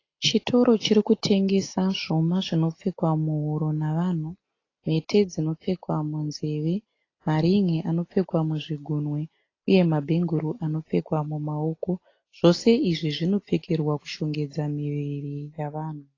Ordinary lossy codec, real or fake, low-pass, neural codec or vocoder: AAC, 32 kbps; real; 7.2 kHz; none